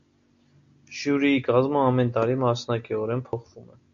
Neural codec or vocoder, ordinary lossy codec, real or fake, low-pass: none; MP3, 64 kbps; real; 7.2 kHz